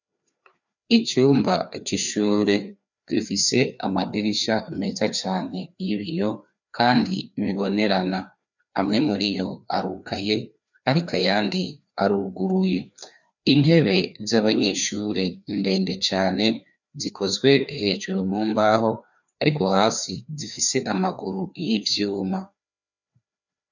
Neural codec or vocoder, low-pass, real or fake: codec, 16 kHz, 2 kbps, FreqCodec, larger model; 7.2 kHz; fake